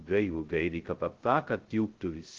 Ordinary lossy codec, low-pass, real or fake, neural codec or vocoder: Opus, 16 kbps; 7.2 kHz; fake; codec, 16 kHz, 0.2 kbps, FocalCodec